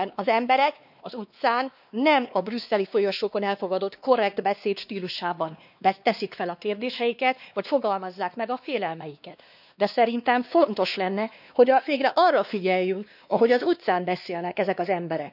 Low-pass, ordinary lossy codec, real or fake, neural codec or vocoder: 5.4 kHz; none; fake; codec, 16 kHz, 2 kbps, X-Codec, WavLM features, trained on Multilingual LibriSpeech